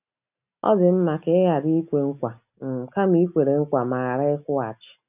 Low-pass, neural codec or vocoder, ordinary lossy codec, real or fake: 3.6 kHz; none; none; real